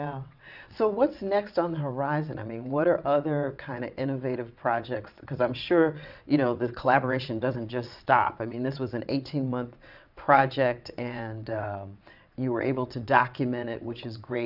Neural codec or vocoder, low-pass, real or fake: vocoder, 22.05 kHz, 80 mel bands, WaveNeXt; 5.4 kHz; fake